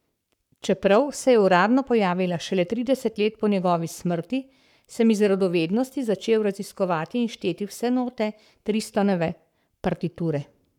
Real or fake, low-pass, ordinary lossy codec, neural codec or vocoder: fake; 19.8 kHz; none; codec, 44.1 kHz, 7.8 kbps, Pupu-Codec